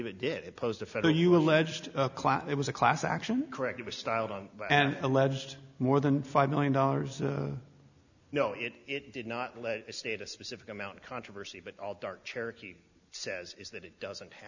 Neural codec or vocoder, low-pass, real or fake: none; 7.2 kHz; real